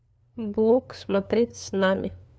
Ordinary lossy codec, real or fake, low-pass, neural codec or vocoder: none; fake; none; codec, 16 kHz, 2 kbps, FunCodec, trained on LibriTTS, 25 frames a second